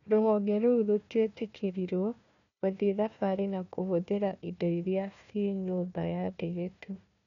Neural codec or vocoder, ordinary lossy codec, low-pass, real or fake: codec, 16 kHz, 1 kbps, FunCodec, trained on Chinese and English, 50 frames a second; none; 7.2 kHz; fake